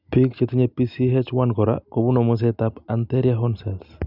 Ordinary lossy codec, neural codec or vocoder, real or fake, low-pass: none; none; real; 5.4 kHz